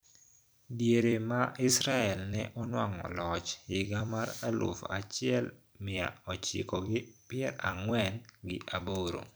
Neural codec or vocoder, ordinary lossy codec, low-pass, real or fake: vocoder, 44.1 kHz, 128 mel bands every 256 samples, BigVGAN v2; none; none; fake